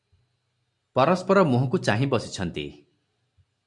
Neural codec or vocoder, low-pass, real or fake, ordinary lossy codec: none; 10.8 kHz; real; MP3, 64 kbps